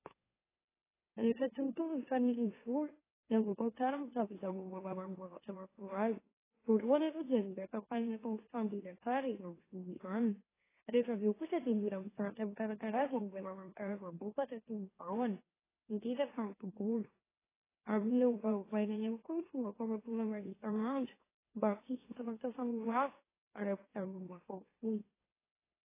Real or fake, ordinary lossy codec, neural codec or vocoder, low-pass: fake; AAC, 16 kbps; autoencoder, 44.1 kHz, a latent of 192 numbers a frame, MeloTTS; 3.6 kHz